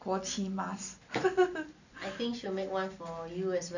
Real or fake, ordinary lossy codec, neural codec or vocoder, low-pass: real; none; none; 7.2 kHz